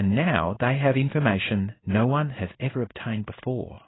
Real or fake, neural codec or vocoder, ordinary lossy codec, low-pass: fake; codec, 16 kHz in and 24 kHz out, 1 kbps, XY-Tokenizer; AAC, 16 kbps; 7.2 kHz